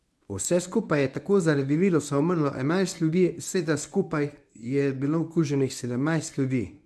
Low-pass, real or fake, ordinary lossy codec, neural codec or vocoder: none; fake; none; codec, 24 kHz, 0.9 kbps, WavTokenizer, medium speech release version 1